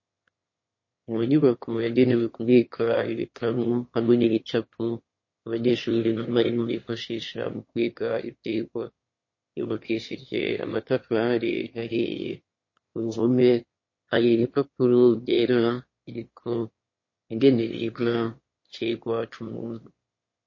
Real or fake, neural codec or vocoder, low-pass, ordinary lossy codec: fake; autoencoder, 22.05 kHz, a latent of 192 numbers a frame, VITS, trained on one speaker; 7.2 kHz; MP3, 32 kbps